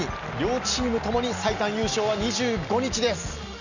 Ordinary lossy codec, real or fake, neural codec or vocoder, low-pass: none; real; none; 7.2 kHz